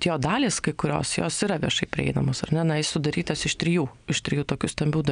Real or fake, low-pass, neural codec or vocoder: real; 9.9 kHz; none